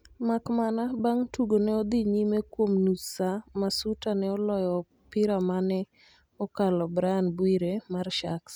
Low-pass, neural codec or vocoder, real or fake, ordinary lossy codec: none; none; real; none